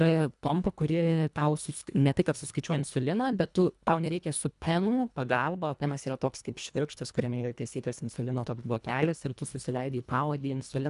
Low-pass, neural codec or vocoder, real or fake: 10.8 kHz; codec, 24 kHz, 1.5 kbps, HILCodec; fake